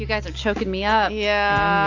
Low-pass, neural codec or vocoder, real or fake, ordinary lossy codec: 7.2 kHz; none; real; AAC, 48 kbps